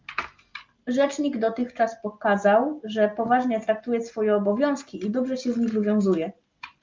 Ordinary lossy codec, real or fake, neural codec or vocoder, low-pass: Opus, 24 kbps; real; none; 7.2 kHz